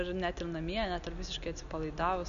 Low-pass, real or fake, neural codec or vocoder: 7.2 kHz; real; none